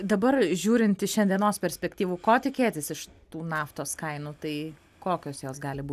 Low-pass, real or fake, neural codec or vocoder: 14.4 kHz; real; none